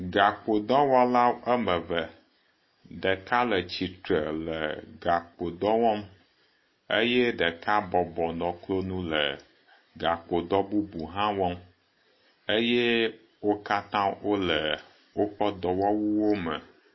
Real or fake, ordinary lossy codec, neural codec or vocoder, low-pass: real; MP3, 24 kbps; none; 7.2 kHz